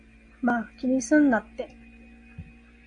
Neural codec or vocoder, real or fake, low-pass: none; real; 9.9 kHz